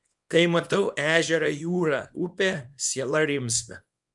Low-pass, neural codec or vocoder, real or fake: 10.8 kHz; codec, 24 kHz, 0.9 kbps, WavTokenizer, small release; fake